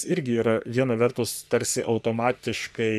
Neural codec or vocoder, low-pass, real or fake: codec, 44.1 kHz, 3.4 kbps, Pupu-Codec; 14.4 kHz; fake